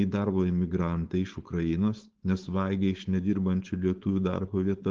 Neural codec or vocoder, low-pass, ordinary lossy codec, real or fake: codec, 16 kHz, 4.8 kbps, FACodec; 7.2 kHz; Opus, 16 kbps; fake